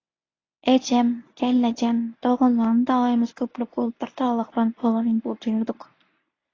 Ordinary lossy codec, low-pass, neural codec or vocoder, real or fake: AAC, 32 kbps; 7.2 kHz; codec, 24 kHz, 0.9 kbps, WavTokenizer, medium speech release version 1; fake